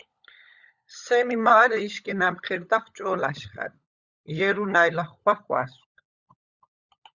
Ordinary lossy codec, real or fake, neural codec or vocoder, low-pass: Opus, 64 kbps; fake; codec, 16 kHz, 16 kbps, FunCodec, trained on LibriTTS, 50 frames a second; 7.2 kHz